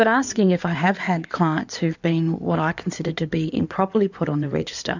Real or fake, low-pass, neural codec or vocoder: fake; 7.2 kHz; codec, 16 kHz in and 24 kHz out, 2.2 kbps, FireRedTTS-2 codec